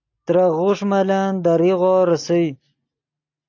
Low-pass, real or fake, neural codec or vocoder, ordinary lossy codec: 7.2 kHz; real; none; AAC, 48 kbps